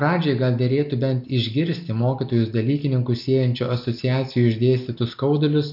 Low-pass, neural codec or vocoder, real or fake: 5.4 kHz; none; real